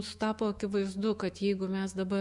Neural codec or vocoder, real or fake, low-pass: autoencoder, 48 kHz, 128 numbers a frame, DAC-VAE, trained on Japanese speech; fake; 10.8 kHz